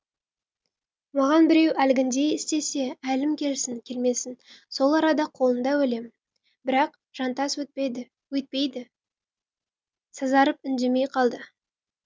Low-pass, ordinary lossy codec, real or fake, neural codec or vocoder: none; none; real; none